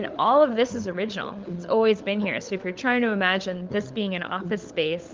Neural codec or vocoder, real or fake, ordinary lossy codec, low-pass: codec, 16 kHz, 16 kbps, FunCodec, trained on LibriTTS, 50 frames a second; fake; Opus, 24 kbps; 7.2 kHz